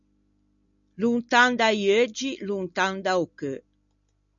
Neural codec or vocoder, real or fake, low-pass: none; real; 7.2 kHz